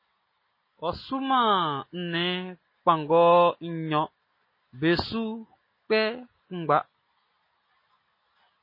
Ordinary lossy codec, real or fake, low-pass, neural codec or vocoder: MP3, 24 kbps; real; 5.4 kHz; none